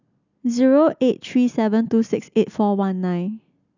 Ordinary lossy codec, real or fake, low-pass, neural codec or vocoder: none; real; 7.2 kHz; none